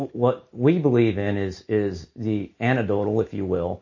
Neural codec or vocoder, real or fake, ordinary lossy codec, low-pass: none; real; MP3, 32 kbps; 7.2 kHz